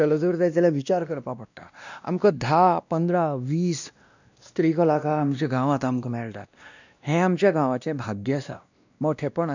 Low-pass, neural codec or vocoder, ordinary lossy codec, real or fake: 7.2 kHz; codec, 16 kHz, 1 kbps, X-Codec, WavLM features, trained on Multilingual LibriSpeech; none; fake